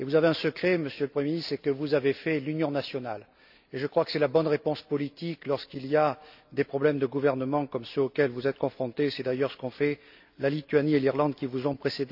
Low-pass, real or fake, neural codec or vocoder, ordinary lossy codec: 5.4 kHz; real; none; none